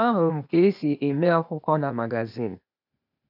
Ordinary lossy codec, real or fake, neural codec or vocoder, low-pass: none; fake; codec, 16 kHz, 0.8 kbps, ZipCodec; 5.4 kHz